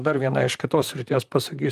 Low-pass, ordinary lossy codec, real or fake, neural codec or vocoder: 14.4 kHz; Opus, 32 kbps; fake; vocoder, 44.1 kHz, 128 mel bands, Pupu-Vocoder